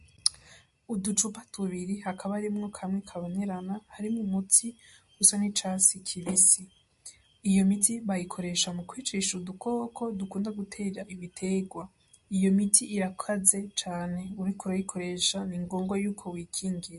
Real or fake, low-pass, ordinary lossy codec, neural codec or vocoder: real; 14.4 kHz; MP3, 48 kbps; none